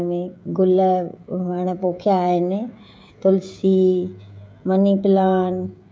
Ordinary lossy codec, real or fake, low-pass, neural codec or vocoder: none; fake; none; codec, 16 kHz, 16 kbps, FreqCodec, smaller model